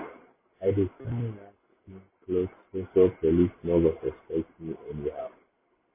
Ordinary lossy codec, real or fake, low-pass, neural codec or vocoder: MP3, 16 kbps; real; 3.6 kHz; none